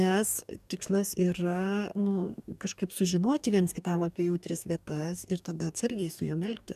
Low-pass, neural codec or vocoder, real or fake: 14.4 kHz; codec, 44.1 kHz, 2.6 kbps, DAC; fake